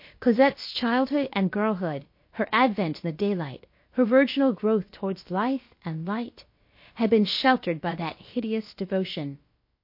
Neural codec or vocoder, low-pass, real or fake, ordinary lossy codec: codec, 16 kHz, about 1 kbps, DyCAST, with the encoder's durations; 5.4 kHz; fake; MP3, 32 kbps